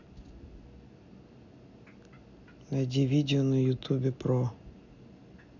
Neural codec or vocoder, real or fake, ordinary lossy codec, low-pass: none; real; none; 7.2 kHz